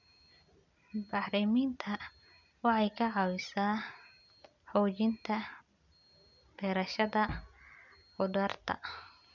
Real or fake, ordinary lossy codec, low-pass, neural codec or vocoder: real; none; 7.2 kHz; none